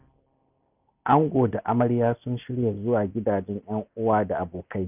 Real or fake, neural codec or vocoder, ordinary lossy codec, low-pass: real; none; none; 3.6 kHz